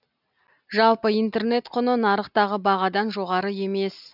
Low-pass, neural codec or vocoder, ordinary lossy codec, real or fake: 5.4 kHz; none; none; real